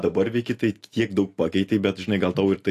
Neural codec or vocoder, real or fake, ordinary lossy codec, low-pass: none; real; AAC, 64 kbps; 14.4 kHz